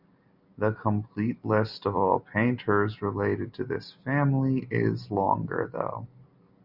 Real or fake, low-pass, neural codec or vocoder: real; 5.4 kHz; none